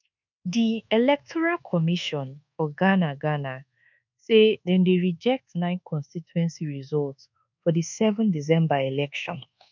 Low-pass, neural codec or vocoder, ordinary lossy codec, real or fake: 7.2 kHz; codec, 24 kHz, 1.2 kbps, DualCodec; none; fake